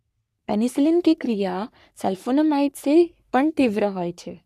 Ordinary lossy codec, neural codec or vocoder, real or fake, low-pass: none; codec, 44.1 kHz, 3.4 kbps, Pupu-Codec; fake; 14.4 kHz